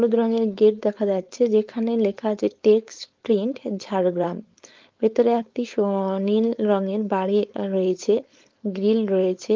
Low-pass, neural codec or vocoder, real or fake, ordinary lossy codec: 7.2 kHz; codec, 16 kHz, 4.8 kbps, FACodec; fake; Opus, 32 kbps